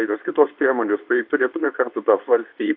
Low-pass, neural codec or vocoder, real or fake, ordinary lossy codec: 5.4 kHz; codec, 24 kHz, 0.9 kbps, WavTokenizer, medium speech release version 2; fake; AAC, 48 kbps